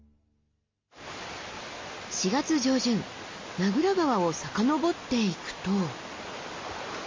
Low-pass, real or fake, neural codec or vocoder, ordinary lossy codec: 7.2 kHz; real; none; MP3, 32 kbps